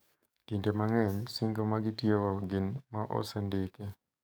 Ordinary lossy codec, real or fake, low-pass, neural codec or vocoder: none; fake; none; codec, 44.1 kHz, 7.8 kbps, DAC